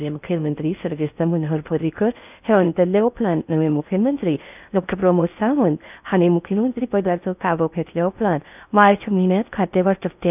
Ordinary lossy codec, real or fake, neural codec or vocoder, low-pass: none; fake; codec, 16 kHz in and 24 kHz out, 0.6 kbps, FocalCodec, streaming, 4096 codes; 3.6 kHz